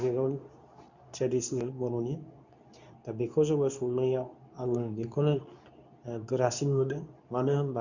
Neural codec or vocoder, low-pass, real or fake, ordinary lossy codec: codec, 24 kHz, 0.9 kbps, WavTokenizer, medium speech release version 2; 7.2 kHz; fake; none